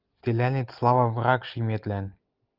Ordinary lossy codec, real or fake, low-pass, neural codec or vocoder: Opus, 24 kbps; real; 5.4 kHz; none